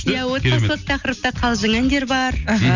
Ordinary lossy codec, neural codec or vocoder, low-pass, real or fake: none; none; 7.2 kHz; real